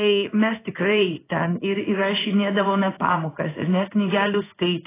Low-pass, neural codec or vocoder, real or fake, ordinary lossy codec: 3.6 kHz; codec, 16 kHz in and 24 kHz out, 1 kbps, XY-Tokenizer; fake; AAC, 16 kbps